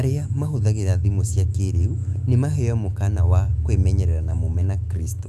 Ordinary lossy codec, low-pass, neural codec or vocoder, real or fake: Opus, 64 kbps; 14.4 kHz; autoencoder, 48 kHz, 128 numbers a frame, DAC-VAE, trained on Japanese speech; fake